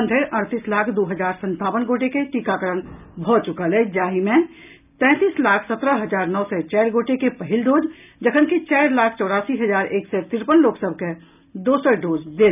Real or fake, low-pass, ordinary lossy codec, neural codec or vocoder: real; 3.6 kHz; none; none